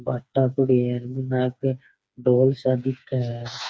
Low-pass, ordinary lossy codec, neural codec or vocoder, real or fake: none; none; codec, 16 kHz, 4 kbps, FreqCodec, smaller model; fake